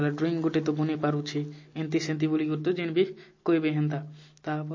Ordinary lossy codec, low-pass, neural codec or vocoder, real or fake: MP3, 32 kbps; 7.2 kHz; none; real